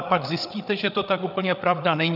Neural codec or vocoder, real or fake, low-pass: codec, 16 kHz, 8 kbps, FreqCodec, larger model; fake; 5.4 kHz